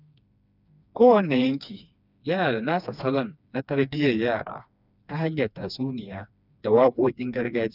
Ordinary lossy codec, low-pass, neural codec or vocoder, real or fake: none; 5.4 kHz; codec, 16 kHz, 2 kbps, FreqCodec, smaller model; fake